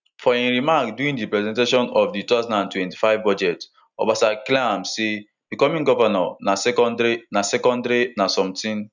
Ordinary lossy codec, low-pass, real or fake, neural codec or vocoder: none; 7.2 kHz; real; none